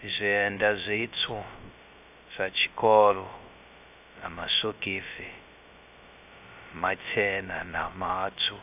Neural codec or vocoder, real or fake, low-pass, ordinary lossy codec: codec, 16 kHz, 0.2 kbps, FocalCodec; fake; 3.6 kHz; AAC, 32 kbps